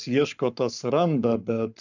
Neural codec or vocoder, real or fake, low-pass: codec, 44.1 kHz, 7.8 kbps, Pupu-Codec; fake; 7.2 kHz